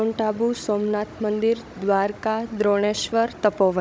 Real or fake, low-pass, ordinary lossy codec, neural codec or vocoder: fake; none; none; codec, 16 kHz, 16 kbps, FunCodec, trained on Chinese and English, 50 frames a second